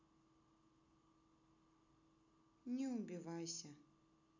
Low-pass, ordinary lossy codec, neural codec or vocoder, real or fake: 7.2 kHz; none; none; real